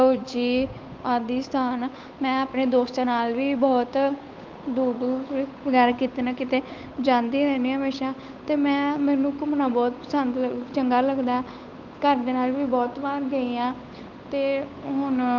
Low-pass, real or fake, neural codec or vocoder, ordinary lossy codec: 7.2 kHz; fake; codec, 16 kHz, 8 kbps, FunCodec, trained on Chinese and English, 25 frames a second; Opus, 24 kbps